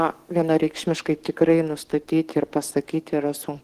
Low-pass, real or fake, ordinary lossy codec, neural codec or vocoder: 14.4 kHz; fake; Opus, 16 kbps; codec, 44.1 kHz, 7.8 kbps, DAC